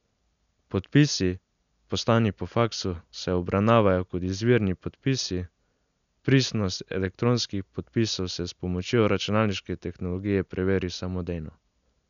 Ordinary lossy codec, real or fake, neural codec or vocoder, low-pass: none; real; none; 7.2 kHz